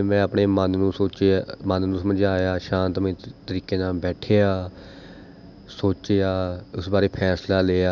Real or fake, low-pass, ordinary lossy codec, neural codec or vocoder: real; 7.2 kHz; none; none